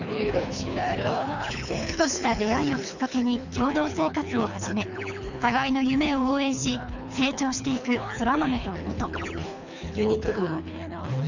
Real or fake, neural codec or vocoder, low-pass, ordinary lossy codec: fake; codec, 24 kHz, 3 kbps, HILCodec; 7.2 kHz; none